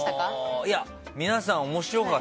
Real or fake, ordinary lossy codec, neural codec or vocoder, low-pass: real; none; none; none